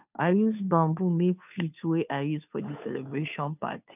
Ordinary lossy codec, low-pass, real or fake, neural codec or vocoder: none; 3.6 kHz; fake; codec, 16 kHz, 2 kbps, FunCodec, trained on Chinese and English, 25 frames a second